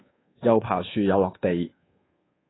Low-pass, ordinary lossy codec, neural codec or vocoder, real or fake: 7.2 kHz; AAC, 16 kbps; codec, 24 kHz, 1.2 kbps, DualCodec; fake